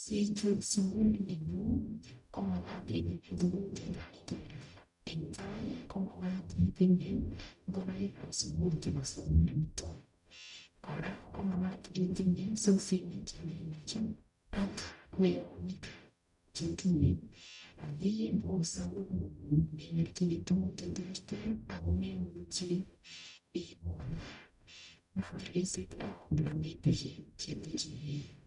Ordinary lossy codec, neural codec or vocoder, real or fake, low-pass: none; codec, 44.1 kHz, 0.9 kbps, DAC; fake; 10.8 kHz